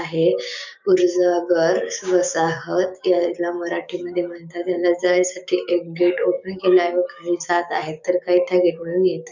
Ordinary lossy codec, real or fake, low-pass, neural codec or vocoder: none; fake; 7.2 kHz; codec, 16 kHz, 6 kbps, DAC